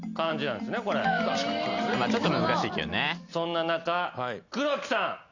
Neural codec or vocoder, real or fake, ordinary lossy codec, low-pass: none; real; Opus, 64 kbps; 7.2 kHz